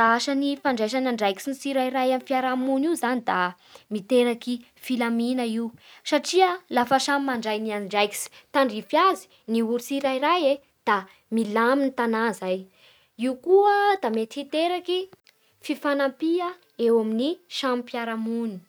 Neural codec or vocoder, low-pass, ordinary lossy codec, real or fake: none; none; none; real